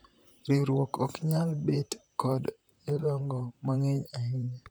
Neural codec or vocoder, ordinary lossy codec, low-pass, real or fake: vocoder, 44.1 kHz, 128 mel bands, Pupu-Vocoder; none; none; fake